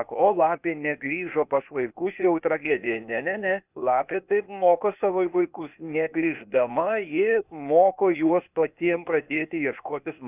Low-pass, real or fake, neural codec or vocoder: 3.6 kHz; fake; codec, 16 kHz, 0.8 kbps, ZipCodec